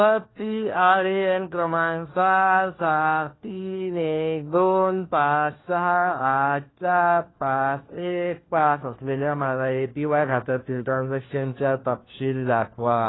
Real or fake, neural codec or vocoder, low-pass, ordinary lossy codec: fake; codec, 16 kHz, 1 kbps, FunCodec, trained on LibriTTS, 50 frames a second; 7.2 kHz; AAC, 16 kbps